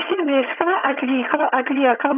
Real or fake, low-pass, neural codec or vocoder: fake; 3.6 kHz; vocoder, 22.05 kHz, 80 mel bands, HiFi-GAN